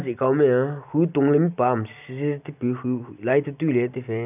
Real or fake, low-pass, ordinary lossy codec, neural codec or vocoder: real; 3.6 kHz; none; none